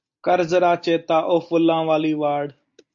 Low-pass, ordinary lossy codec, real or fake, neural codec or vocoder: 7.2 kHz; AAC, 64 kbps; real; none